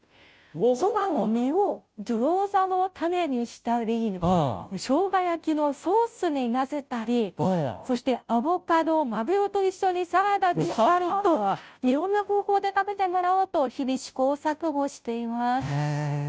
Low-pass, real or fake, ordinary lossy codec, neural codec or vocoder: none; fake; none; codec, 16 kHz, 0.5 kbps, FunCodec, trained on Chinese and English, 25 frames a second